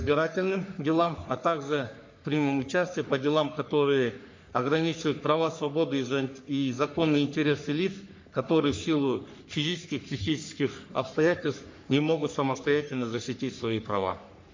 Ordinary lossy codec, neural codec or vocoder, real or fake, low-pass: MP3, 48 kbps; codec, 44.1 kHz, 3.4 kbps, Pupu-Codec; fake; 7.2 kHz